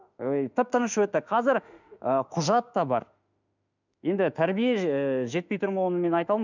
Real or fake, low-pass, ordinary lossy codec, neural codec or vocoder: fake; 7.2 kHz; none; autoencoder, 48 kHz, 32 numbers a frame, DAC-VAE, trained on Japanese speech